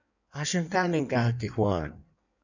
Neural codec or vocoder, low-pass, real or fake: codec, 16 kHz in and 24 kHz out, 1.1 kbps, FireRedTTS-2 codec; 7.2 kHz; fake